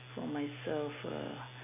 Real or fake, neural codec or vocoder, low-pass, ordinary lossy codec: real; none; 3.6 kHz; none